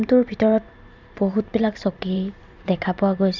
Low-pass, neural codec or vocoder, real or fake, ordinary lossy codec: 7.2 kHz; vocoder, 44.1 kHz, 128 mel bands every 512 samples, BigVGAN v2; fake; Opus, 64 kbps